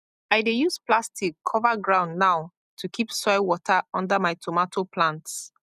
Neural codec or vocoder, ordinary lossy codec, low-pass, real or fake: none; none; 14.4 kHz; real